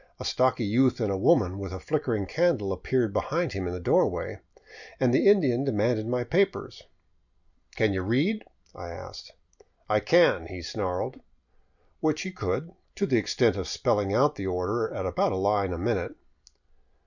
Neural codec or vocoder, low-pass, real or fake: none; 7.2 kHz; real